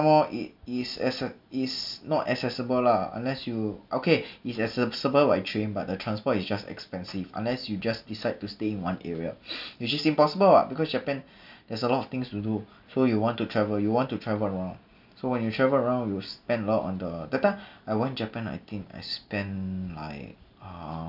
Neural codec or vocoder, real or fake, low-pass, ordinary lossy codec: none; real; 5.4 kHz; none